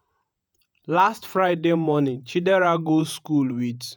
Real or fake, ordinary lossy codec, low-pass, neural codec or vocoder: fake; none; none; vocoder, 48 kHz, 128 mel bands, Vocos